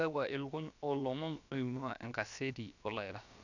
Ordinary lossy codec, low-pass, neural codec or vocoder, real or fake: none; 7.2 kHz; codec, 16 kHz, about 1 kbps, DyCAST, with the encoder's durations; fake